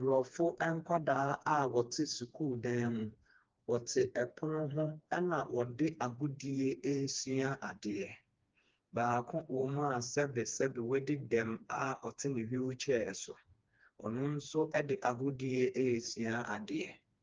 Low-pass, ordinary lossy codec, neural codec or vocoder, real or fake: 7.2 kHz; Opus, 24 kbps; codec, 16 kHz, 2 kbps, FreqCodec, smaller model; fake